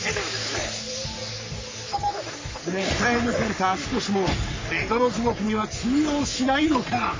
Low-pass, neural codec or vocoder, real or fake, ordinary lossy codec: 7.2 kHz; codec, 44.1 kHz, 3.4 kbps, Pupu-Codec; fake; MP3, 32 kbps